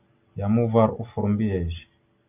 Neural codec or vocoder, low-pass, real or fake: none; 3.6 kHz; real